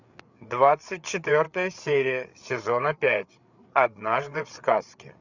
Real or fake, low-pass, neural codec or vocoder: fake; 7.2 kHz; codec, 16 kHz, 8 kbps, FreqCodec, larger model